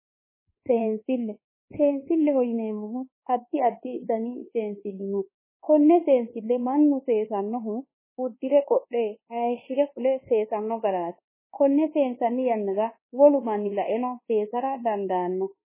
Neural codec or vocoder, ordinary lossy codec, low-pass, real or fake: codec, 24 kHz, 1.2 kbps, DualCodec; MP3, 16 kbps; 3.6 kHz; fake